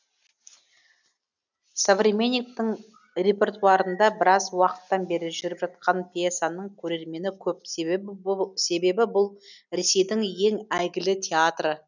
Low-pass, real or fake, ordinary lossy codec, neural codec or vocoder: 7.2 kHz; real; none; none